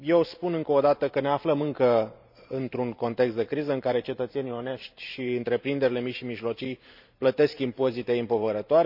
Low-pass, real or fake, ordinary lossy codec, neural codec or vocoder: 5.4 kHz; fake; none; vocoder, 44.1 kHz, 128 mel bands every 512 samples, BigVGAN v2